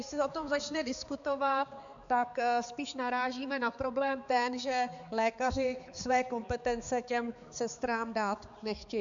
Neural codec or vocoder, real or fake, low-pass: codec, 16 kHz, 4 kbps, X-Codec, HuBERT features, trained on balanced general audio; fake; 7.2 kHz